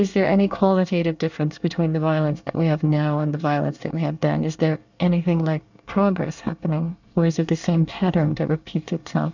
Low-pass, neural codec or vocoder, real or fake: 7.2 kHz; codec, 24 kHz, 1 kbps, SNAC; fake